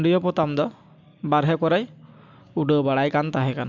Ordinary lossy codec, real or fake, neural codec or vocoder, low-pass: MP3, 64 kbps; real; none; 7.2 kHz